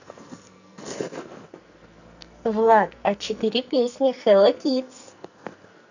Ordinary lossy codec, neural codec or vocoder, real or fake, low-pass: none; codec, 44.1 kHz, 2.6 kbps, SNAC; fake; 7.2 kHz